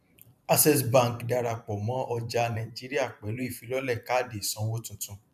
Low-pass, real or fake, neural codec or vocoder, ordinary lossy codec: 14.4 kHz; fake; vocoder, 44.1 kHz, 128 mel bands every 512 samples, BigVGAN v2; none